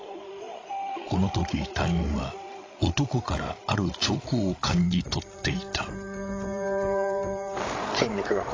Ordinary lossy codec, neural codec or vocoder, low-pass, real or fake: AAC, 32 kbps; codec, 16 kHz, 16 kbps, FreqCodec, larger model; 7.2 kHz; fake